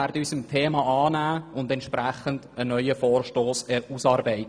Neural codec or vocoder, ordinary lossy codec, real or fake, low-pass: none; none; real; 9.9 kHz